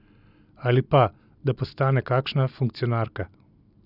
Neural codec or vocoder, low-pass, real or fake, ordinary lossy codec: none; 5.4 kHz; real; none